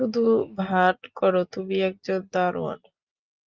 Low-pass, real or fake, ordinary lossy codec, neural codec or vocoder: 7.2 kHz; real; Opus, 24 kbps; none